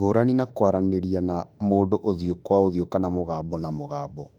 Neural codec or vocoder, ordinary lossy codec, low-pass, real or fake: autoencoder, 48 kHz, 32 numbers a frame, DAC-VAE, trained on Japanese speech; none; 19.8 kHz; fake